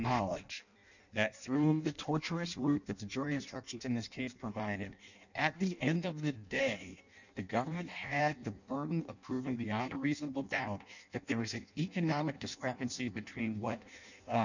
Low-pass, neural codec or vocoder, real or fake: 7.2 kHz; codec, 16 kHz in and 24 kHz out, 0.6 kbps, FireRedTTS-2 codec; fake